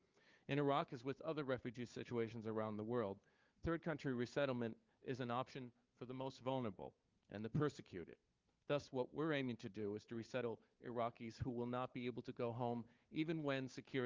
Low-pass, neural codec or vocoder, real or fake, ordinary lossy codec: 7.2 kHz; codec, 16 kHz, 4 kbps, FunCodec, trained on Chinese and English, 50 frames a second; fake; Opus, 24 kbps